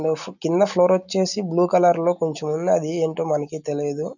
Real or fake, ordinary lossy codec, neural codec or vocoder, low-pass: real; none; none; 7.2 kHz